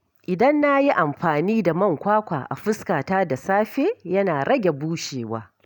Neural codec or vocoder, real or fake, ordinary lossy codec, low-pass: none; real; none; 19.8 kHz